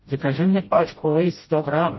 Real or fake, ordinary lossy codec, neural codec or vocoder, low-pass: fake; MP3, 24 kbps; codec, 16 kHz, 0.5 kbps, FreqCodec, smaller model; 7.2 kHz